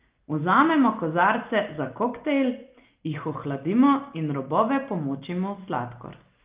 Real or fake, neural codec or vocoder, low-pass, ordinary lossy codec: real; none; 3.6 kHz; Opus, 32 kbps